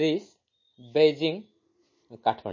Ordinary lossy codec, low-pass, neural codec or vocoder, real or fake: MP3, 32 kbps; 7.2 kHz; none; real